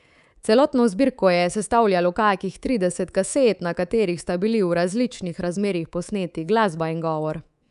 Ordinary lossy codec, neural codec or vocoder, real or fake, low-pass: none; codec, 24 kHz, 3.1 kbps, DualCodec; fake; 10.8 kHz